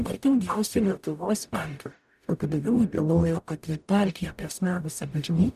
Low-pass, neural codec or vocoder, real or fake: 14.4 kHz; codec, 44.1 kHz, 0.9 kbps, DAC; fake